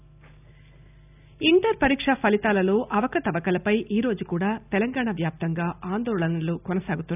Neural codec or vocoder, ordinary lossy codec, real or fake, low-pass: none; none; real; 3.6 kHz